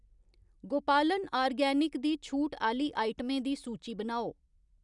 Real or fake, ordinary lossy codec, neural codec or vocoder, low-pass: real; none; none; 10.8 kHz